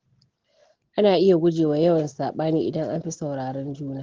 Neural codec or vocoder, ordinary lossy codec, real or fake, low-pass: none; Opus, 16 kbps; real; 7.2 kHz